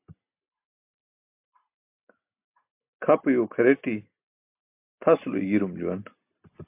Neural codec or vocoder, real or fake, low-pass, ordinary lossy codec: none; real; 3.6 kHz; MP3, 32 kbps